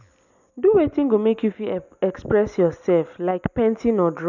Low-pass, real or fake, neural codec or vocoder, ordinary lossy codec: 7.2 kHz; real; none; none